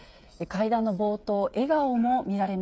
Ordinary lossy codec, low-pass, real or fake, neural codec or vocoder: none; none; fake; codec, 16 kHz, 8 kbps, FreqCodec, smaller model